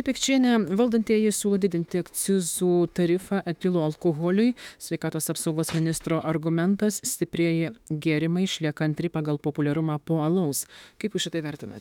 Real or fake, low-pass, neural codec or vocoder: fake; 19.8 kHz; autoencoder, 48 kHz, 32 numbers a frame, DAC-VAE, trained on Japanese speech